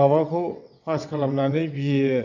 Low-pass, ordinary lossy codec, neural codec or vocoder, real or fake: 7.2 kHz; none; vocoder, 22.05 kHz, 80 mel bands, WaveNeXt; fake